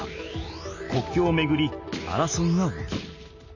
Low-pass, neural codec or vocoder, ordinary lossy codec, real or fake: 7.2 kHz; none; none; real